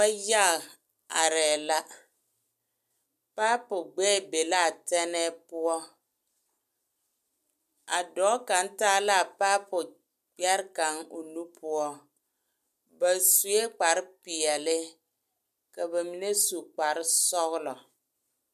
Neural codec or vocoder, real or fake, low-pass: none; real; 14.4 kHz